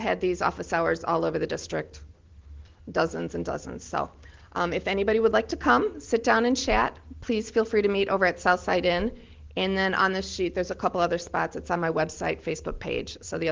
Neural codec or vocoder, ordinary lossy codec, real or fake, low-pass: none; Opus, 16 kbps; real; 7.2 kHz